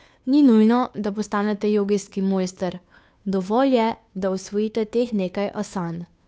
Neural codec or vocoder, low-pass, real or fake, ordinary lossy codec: codec, 16 kHz, 2 kbps, FunCodec, trained on Chinese and English, 25 frames a second; none; fake; none